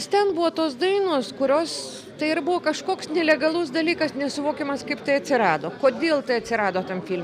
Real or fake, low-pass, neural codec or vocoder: real; 14.4 kHz; none